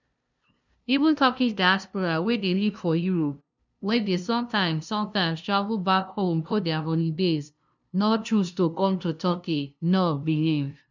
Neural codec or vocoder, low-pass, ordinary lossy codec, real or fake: codec, 16 kHz, 0.5 kbps, FunCodec, trained on LibriTTS, 25 frames a second; 7.2 kHz; none; fake